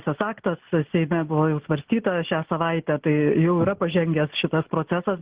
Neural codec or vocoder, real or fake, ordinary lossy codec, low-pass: none; real; Opus, 16 kbps; 3.6 kHz